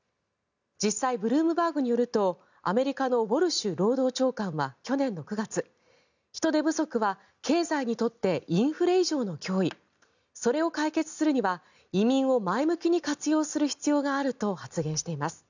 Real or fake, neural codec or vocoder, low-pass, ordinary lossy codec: real; none; 7.2 kHz; none